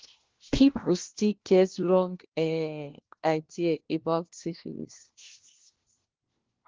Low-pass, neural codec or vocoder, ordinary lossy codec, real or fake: 7.2 kHz; codec, 16 kHz, 1 kbps, FunCodec, trained on LibriTTS, 50 frames a second; Opus, 16 kbps; fake